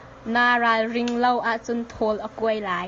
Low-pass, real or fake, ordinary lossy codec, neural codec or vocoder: 7.2 kHz; real; Opus, 32 kbps; none